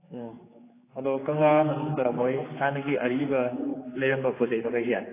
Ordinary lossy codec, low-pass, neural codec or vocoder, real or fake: AAC, 16 kbps; 3.6 kHz; codec, 16 kHz, 4 kbps, X-Codec, HuBERT features, trained on general audio; fake